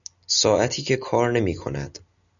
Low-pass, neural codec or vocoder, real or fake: 7.2 kHz; none; real